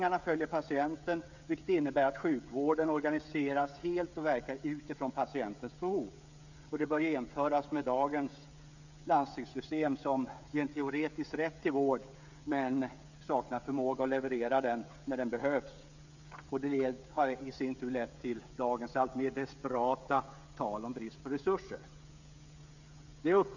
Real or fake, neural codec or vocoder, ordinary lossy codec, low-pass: fake; codec, 16 kHz, 16 kbps, FreqCodec, smaller model; none; 7.2 kHz